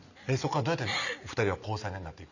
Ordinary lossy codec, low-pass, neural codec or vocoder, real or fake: none; 7.2 kHz; none; real